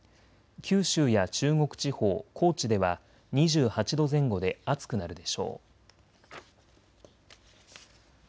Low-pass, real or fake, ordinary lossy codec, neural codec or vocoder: none; real; none; none